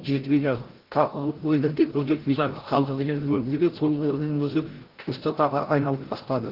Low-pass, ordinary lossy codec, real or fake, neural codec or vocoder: 5.4 kHz; Opus, 16 kbps; fake; codec, 16 kHz, 0.5 kbps, FreqCodec, larger model